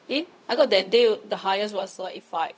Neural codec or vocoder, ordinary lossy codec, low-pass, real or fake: codec, 16 kHz, 0.4 kbps, LongCat-Audio-Codec; none; none; fake